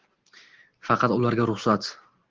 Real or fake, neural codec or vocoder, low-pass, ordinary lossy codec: real; none; 7.2 kHz; Opus, 16 kbps